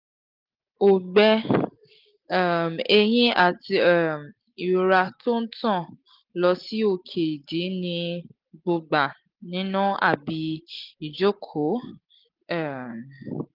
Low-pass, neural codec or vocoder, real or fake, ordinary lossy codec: 5.4 kHz; none; real; Opus, 32 kbps